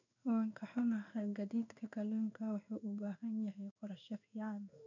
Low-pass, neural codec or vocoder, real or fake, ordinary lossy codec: 7.2 kHz; codec, 16 kHz in and 24 kHz out, 1 kbps, XY-Tokenizer; fake; none